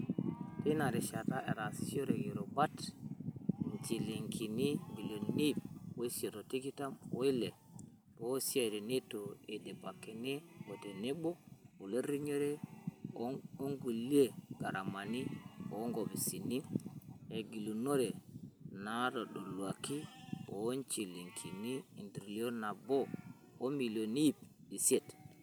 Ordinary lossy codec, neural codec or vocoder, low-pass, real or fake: none; none; none; real